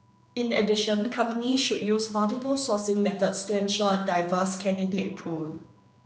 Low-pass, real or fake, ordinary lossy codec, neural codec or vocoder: none; fake; none; codec, 16 kHz, 2 kbps, X-Codec, HuBERT features, trained on general audio